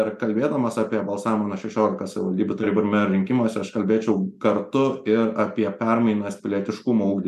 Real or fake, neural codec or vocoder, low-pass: real; none; 14.4 kHz